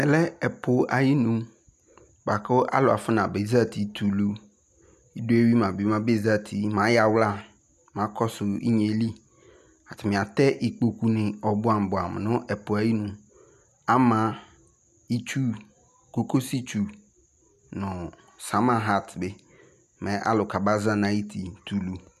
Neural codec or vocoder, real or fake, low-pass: none; real; 14.4 kHz